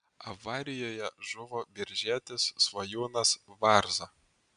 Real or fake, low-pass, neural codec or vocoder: real; 9.9 kHz; none